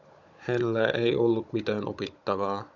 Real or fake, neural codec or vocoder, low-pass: fake; codec, 16 kHz, 16 kbps, FunCodec, trained on Chinese and English, 50 frames a second; 7.2 kHz